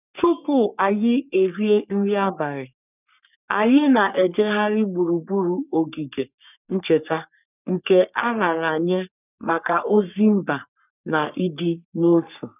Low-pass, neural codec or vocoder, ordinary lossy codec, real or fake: 3.6 kHz; codec, 44.1 kHz, 3.4 kbps, Pupu-Codec; none; fake